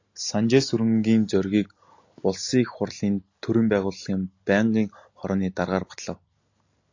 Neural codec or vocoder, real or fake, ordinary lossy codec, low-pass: none; real; AAC, 48 kbps; 7.2 kHz